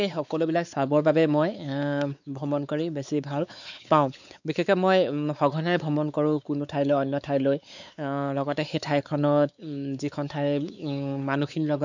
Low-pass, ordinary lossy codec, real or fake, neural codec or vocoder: 7.2 kHz; none; fake; codec, 16 kHz, 4 kbps, X-Codec, WavLM features, trained on Multilingual LibriSpeech